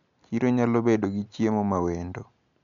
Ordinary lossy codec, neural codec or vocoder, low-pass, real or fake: none; none; 7.2 kHz; real